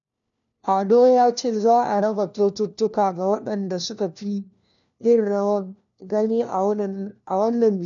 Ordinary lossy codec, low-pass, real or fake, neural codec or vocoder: none; 7.2 kHz; fake; codec, 16 kHz, 1 kbps, FunCodec, trained on LibriTTS, 50 frames a second